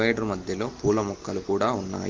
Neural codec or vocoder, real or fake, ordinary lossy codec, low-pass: none; real; Opus, 16 kbps; 7.2 kHz